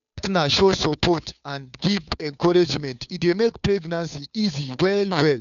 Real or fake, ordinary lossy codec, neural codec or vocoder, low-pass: fake; none; codec, 16 kHz, 2 kbps, FunCodec, trained on Chinese and English, 25 frames a second; 7.2 kHz